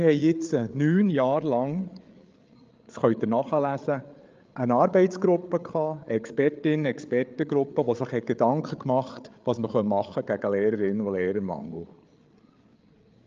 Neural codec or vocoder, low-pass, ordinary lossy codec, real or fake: codec, 16 kHz, 8 kbps, FreqCodec, larger model; 7.2 kHz; Opus, 24 kbps; fake